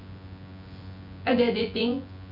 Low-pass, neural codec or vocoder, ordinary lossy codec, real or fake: 5.4 kHz; vocoder, 24 kHz, 100 mel bands, Vocos; none; fake